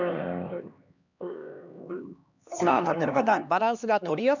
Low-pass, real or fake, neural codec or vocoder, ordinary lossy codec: 7.2 kHz; fake; codec, 16 kHz, 2 kbps, X-Codec, HuBERT features, trained on LibriSpeech; none